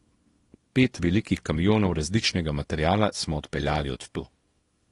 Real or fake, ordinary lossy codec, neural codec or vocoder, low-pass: fake; AAC, 32 kbps; codec, 24 kHz, 0.9 kbps, WavTokenizer, small release; 10.8 kHz